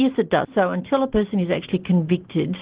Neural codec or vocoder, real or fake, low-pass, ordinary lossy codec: none; real; 3.6 kHz; Opus, 16 kbps